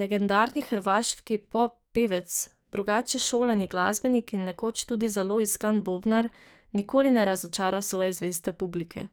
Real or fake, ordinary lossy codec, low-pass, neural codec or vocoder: fake; none; none; codec, 44.1 kHz, 2.6 kbps, SNAC